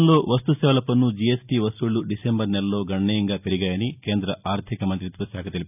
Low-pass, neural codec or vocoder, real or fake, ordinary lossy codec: 3.6 kHz; none; real; none